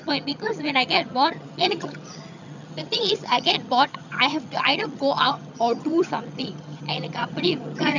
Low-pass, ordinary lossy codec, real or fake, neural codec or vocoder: 7.2 kHz; none; fake; vocoder, 22.05 kHz, 80 mel bands, HiFi-GAN